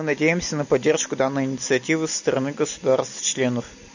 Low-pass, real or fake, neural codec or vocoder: 7.2 kHz; real; none